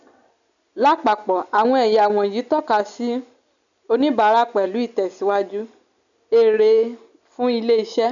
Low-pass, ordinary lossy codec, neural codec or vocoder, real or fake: 7.2 kHz; none; none; real